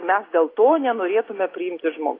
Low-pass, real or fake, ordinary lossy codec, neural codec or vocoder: 5.4 kHz; real; AAC, 24 kbps; none